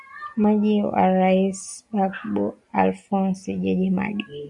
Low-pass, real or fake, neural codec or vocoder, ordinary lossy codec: 10.8 kHz; real; none; MP3, 96 kbps